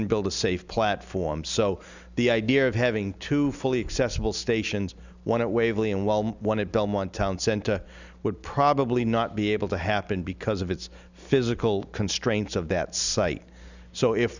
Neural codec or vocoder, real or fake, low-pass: none; real; 7.2 kHz